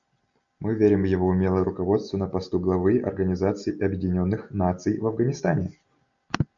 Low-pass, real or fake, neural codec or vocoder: 7.2 kHz; real; none